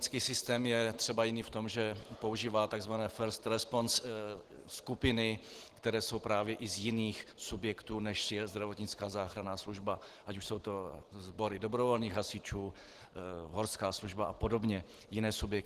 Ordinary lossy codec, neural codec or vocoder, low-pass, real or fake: Opus, 16 kbps; none; 14.4 kHz; real